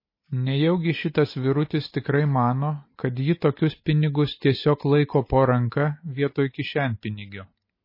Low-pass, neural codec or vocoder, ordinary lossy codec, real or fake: 5.4 kHz; none; MP3, 24 kbps; real